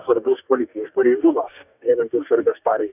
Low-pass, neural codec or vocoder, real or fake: 3.6 kHz; codec, 44.1 kHz, 2.6 kbps, DAC; fake